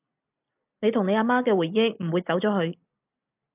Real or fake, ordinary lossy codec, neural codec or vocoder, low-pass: real; AAC, 24 kbps; none; 3.6 kHz